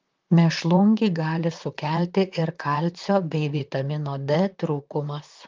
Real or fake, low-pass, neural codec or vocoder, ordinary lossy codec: fake; 7.2 kHz; vocoder, 44.1 kHz, 128 mel bands, Pupu-Vocoder; Opus, 24 kbps